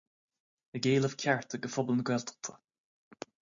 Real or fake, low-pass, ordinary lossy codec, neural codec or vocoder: real; 7.2 kHz; MP3, 64 kbps; none